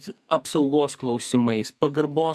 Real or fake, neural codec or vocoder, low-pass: fake; codec, 44.1 kHz, 2.6 kbps, SNAC; 14.4 kHz